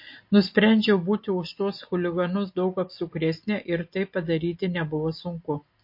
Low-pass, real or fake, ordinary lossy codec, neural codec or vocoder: 5.4 kHz; real; MP3, 32 kbps; none